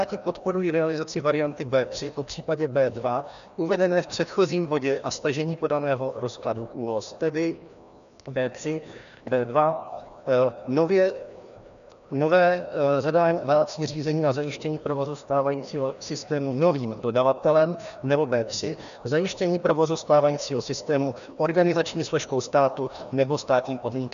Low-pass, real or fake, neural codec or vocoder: 7.2 kHz; fake; codec, 16 kHz, 1 kbps, FreqCodec, larger model